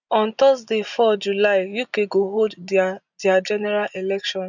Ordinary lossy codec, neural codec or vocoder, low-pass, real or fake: none; none; 7.2 kHz; real